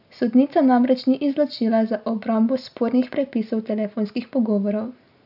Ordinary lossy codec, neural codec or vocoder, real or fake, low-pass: none; none; real; 5.4 kHz